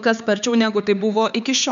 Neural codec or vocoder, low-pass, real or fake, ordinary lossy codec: codec, 16 kHz, 4 kbps, X-Codec, HuBERT features, trained on LibriSpeech; 7.2 kHz; fake; MP3, 96 kbps